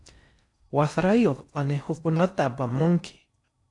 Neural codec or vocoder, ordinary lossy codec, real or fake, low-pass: codec, 16 kHz in and 24 kHz out, 0.6 kbps, FocalCodec, streaming, 4096 codes; AAC, 64 kbps; fake; 10.8 kHz